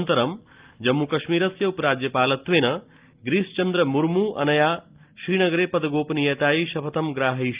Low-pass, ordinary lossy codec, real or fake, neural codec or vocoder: 3.6 kHz; Opus, 32 kbps; real; none